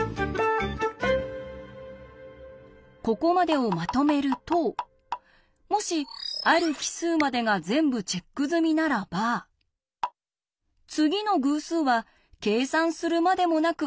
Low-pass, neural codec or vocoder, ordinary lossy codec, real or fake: none; none; none; real